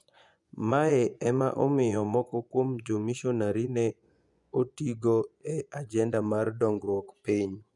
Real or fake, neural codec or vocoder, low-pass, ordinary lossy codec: fake; vocoder, 24 kHz, 100 mel bands, Vocos; 10.8 kHz; none